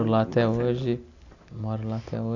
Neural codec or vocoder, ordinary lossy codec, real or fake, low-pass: none; none; real; 7.2 kHz